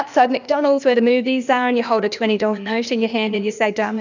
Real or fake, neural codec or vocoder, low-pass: fake; codec, 16 kHz, 0.8 kbps, ZipCodec; 7.2 kHz